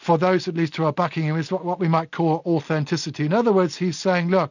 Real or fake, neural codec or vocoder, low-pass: real; none; 7.2 kHz